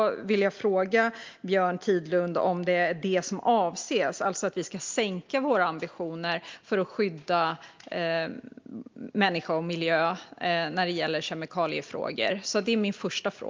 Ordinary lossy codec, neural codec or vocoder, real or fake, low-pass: Opus, 32 kbps; none; real; 7.2 kHz